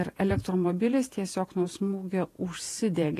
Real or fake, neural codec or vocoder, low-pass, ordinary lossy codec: fake; vocoder, 48 kHz, 128 mel bands, Vocos; 14.4 kHz; AAC, 48 kbps